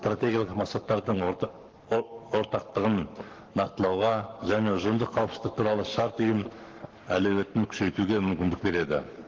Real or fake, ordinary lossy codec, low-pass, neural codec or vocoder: fake; Opus, 16 kbps; 7.2 kHz; codec, 44.1 kHz, 7.8 kbps, Pupu-Codec